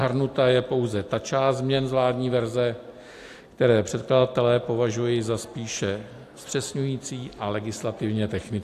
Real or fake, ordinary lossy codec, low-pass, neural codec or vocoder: real; AAC, 64 kbps; 14.4 kHz; none